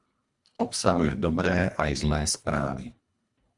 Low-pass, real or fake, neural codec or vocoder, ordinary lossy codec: 10.8 kHz; fake; codec, 24 kHz, 1.5 kbps, HILCodec; Opus, 64 kbps